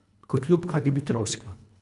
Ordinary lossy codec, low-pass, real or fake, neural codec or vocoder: none; 10.8 kHz; fake; codec, 24 kHz, 1.5 kbps, HILCodec